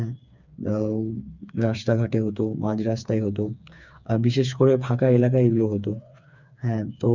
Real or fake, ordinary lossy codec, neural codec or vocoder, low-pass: fake; none; codec, 16 kHz, 4 kbps, FreqCodec, smaller model; 7.2 kHz